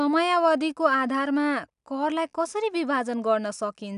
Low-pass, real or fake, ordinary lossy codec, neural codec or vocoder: 10.8 kHz; real; none; none